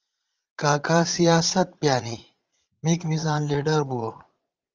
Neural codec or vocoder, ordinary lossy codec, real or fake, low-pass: vocoder, 44.1 kHz, 80 mel bands, Vocos; Opus, 32 kbps; fake; 7.2 kHz